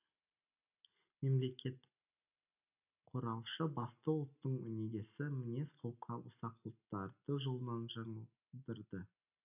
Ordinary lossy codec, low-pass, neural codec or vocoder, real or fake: none; 3.6 kHz; none; real